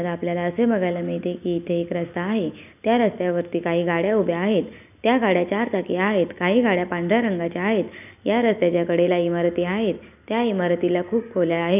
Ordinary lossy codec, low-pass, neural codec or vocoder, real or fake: none; 3.6 kHz; none; real